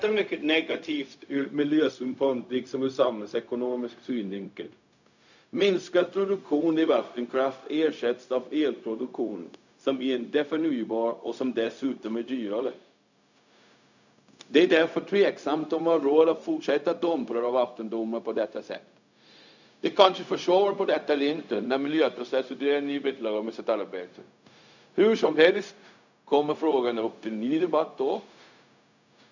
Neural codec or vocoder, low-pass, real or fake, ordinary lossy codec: codec, 16 kHz, 0.4 kbps, LongCat-Audio-Codec; 7.2 kHz; fake; none